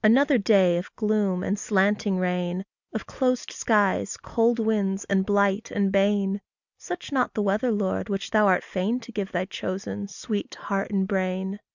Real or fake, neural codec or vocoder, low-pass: real; none; 7.2 kHz